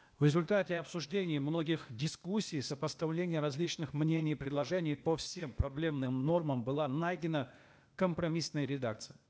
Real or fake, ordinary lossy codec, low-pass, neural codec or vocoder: fake; none; none; codec, 16 kHz, 0.8 kbps, ZipCodec